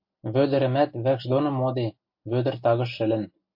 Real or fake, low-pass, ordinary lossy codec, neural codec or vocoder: real; 5.4 kHz; MP3, 32 kbps; none